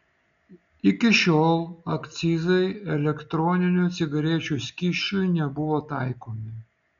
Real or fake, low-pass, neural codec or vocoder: real; 7.2 kHz; none